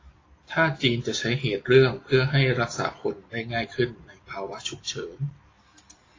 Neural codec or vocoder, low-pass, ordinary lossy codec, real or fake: none; 7.2 kHz; AAC, 32 kbps; real